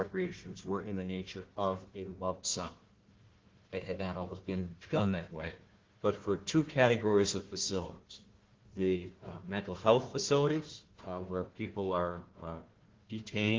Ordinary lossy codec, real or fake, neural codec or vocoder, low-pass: Opus, 16 kbps; fake; codec, 16 kHz, 1 kbps, FunCodec, trained on Chinese and English, 50 frames a second; 7.2 kHz